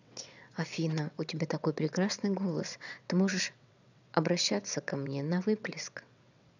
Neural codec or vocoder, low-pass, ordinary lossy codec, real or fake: vocoder, 22.05 kHz, 80 mel bands, WaveNeXt; 7.2 kHz; none; fake